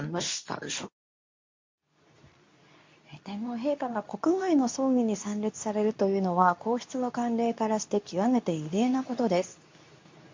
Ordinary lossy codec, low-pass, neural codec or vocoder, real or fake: MP3, 48 kbps; 7.2 kHz; codec, 24 kHz, 0.9 kbps, WavTokenizer, medium speech release version 2; fake